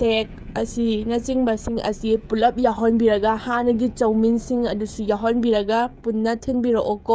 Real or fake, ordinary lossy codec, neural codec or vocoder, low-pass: fake; none; codec, 16 kHz, 16 kbps, FreqCodec, smaller model; none